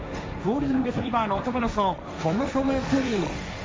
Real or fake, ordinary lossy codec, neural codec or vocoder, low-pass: fake; none; codec, 16 kHz, 1.1 kbps, Voila-Tokenizer; none